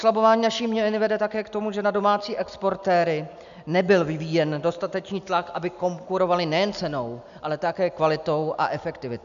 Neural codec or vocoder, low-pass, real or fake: none; 7.2 kHz; real